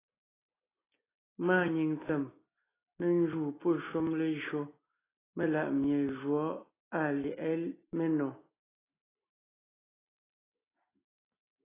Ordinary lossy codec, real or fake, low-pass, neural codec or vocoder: AAC, 16 kbps; real; 3.6 kHz; none